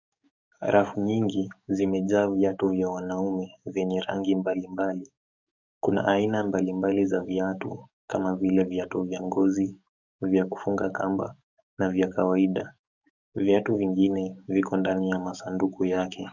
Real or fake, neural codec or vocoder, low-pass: fake; codec, 44.1 kHz, 7.8 kbps, DAC; 7.2 kHz